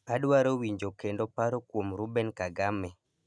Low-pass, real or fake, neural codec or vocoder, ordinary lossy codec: none; real; none; none